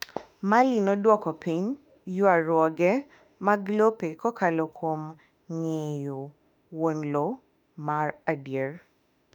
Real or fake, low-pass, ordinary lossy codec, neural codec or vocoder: fake; 19.8 kHz; none; autoencoder, 48 kHz, 32 numbers a frame, DAC-VAE, trained on Japanese speech